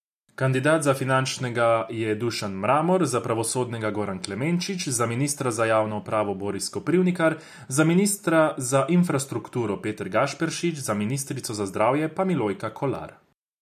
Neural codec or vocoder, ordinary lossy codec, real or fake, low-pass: none; none; real; 14.4 kHz